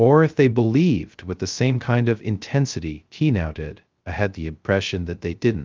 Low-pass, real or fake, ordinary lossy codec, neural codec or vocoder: 7.2 kHz; fake; Opus, 24 kbps; codec, 16 kHz, 0.2 kbps, FocalCodec